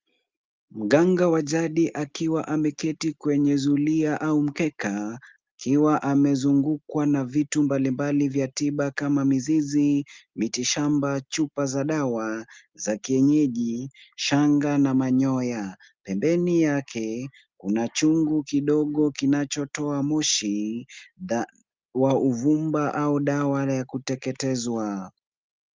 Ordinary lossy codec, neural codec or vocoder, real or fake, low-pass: Opus, 32 kbps; none; real; 7.2 kHz